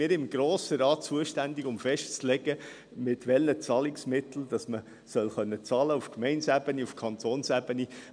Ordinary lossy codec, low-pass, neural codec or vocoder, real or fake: none; 9.9 kHz; none; real